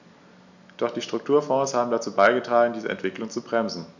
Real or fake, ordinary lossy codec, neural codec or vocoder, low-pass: real; none; none; 7.2 kHz